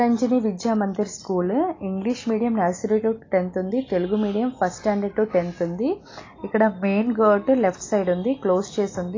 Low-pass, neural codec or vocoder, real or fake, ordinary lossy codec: 7.2 kHz; none; real; AAC, 32 kbps